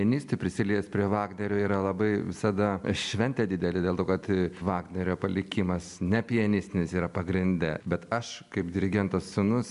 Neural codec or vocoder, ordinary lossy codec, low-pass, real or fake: none; MP3, 96 kbps; 10.8 kHz; real